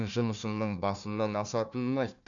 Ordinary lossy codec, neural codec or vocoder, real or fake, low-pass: none; codec, 16 kHz, 1 kbps, FunCodec, trained on Chinese and English, 50 frames a second; fake; 7.2 kHz